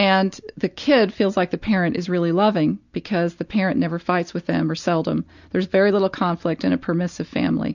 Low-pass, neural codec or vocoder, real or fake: 7.2 kHz; none; real